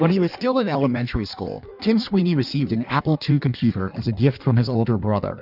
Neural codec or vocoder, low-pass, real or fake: codec, 16 kHz in and 24 kHz out, 1.1 kbps, FireRedTTS-2 codec; 5.4 kHz; fake